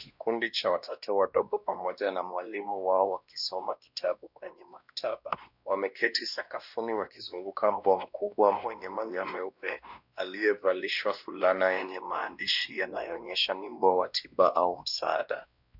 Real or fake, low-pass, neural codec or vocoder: fake; 5.4 kHz; codec, 16 kHz, 1 kbps, X-Codec, WavLM features, trained on Multilingual LibriSpeech